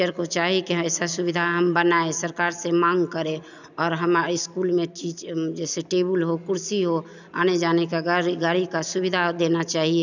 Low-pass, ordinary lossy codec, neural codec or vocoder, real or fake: 7.2 kHz; none; none; real